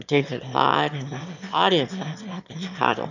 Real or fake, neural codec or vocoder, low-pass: fake; autoencoder, 22.05 kHz, a latent of 192 numbers a frame, VITS, trained on one speaker; 7.2 kHz